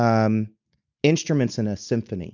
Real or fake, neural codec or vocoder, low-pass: real; none; 7.2 kHz